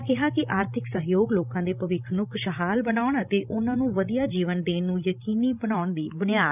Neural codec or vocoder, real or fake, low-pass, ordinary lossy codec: codec, 16 kHz, 16 kbps, FreqCodec, larger model; fake; 3.6 kHz; none